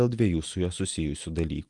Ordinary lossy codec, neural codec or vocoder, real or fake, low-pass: Opus, 32 kbps; none; real; 10.8 kHz